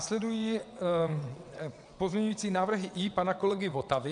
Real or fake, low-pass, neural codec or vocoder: fake; 9.9 kHz; vocoder, 22.05 kHz, 80 mel bands, WaveNeXt